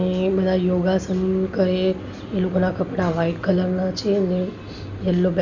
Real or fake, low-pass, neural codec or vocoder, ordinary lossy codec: real; 7.2 kHz; none; none